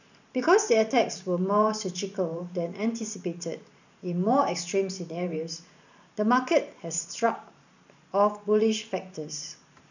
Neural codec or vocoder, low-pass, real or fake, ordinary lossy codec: vocoder, 44.1 kHz, 128 mel bands every 512 samples, BigVGAN v2; 7.2 kHz; fake; none